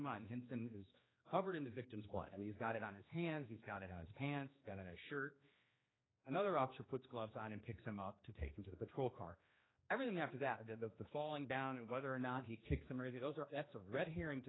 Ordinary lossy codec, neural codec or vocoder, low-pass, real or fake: AAC, 16 kbps; codec, 16 kHz, 2 kbps, X-Codec, HuBERT features, trained on general audio; 7.2 kHz; fake